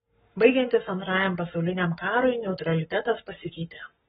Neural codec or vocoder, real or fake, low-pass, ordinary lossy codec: codec, 44.1 kHz, 7.8 kbps, Pupu-Codec; fake; 19.8 kHz; AAC, 16 kbps